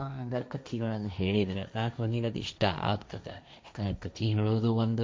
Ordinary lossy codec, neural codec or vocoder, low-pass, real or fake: none; codec, 16 kHz, 1.1 kbps, Voila-Tokenizer; none; fake